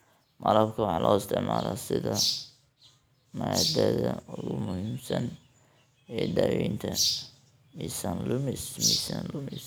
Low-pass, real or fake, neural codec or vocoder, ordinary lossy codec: none; real; none; none